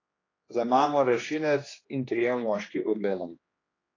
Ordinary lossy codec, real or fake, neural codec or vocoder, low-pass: AAC, 32 kbps; fake; codec, 16 kHz, 2 kbps, X-Codec, HuBERT features, trained on general audio; 7.2 kHz